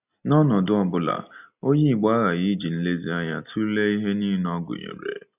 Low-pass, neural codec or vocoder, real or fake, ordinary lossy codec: 3.6 kHz; none; real; none